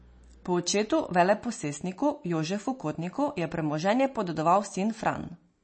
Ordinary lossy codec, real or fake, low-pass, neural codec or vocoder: MP3, 32 kbps; real; 9.9 kHz; none